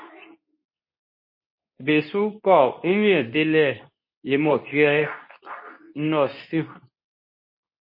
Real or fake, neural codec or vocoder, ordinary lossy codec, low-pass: fake; codec, 24 kHz, 0.9 kbps, WavTokenizer, medium speech release version 2; MP3, 24 kbps; 5.4 kHz